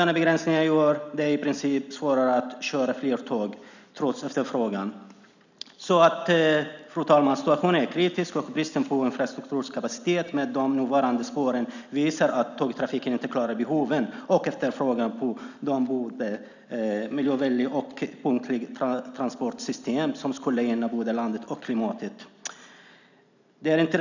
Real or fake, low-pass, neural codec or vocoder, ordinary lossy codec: real; 7.2 kHz; none; none